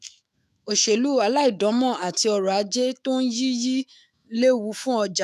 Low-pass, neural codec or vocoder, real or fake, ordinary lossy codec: 14.4 kHz; autoencoder, 48 kHz, 128 numbers a frame, DAC-VAE, trained on Japanese speech; fake; none